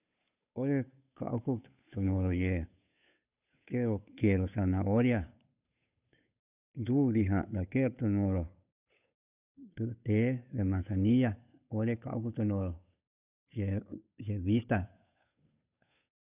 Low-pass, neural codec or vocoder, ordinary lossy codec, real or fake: 3.6 kHz; codec, 16 kHz, 8 kbps, FunCodec, trained on Chinese and English, 25 frames a second; none; fake